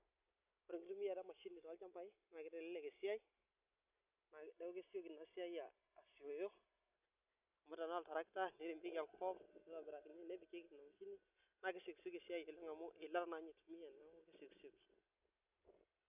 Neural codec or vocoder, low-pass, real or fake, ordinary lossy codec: none; 3.6 kHz; real; none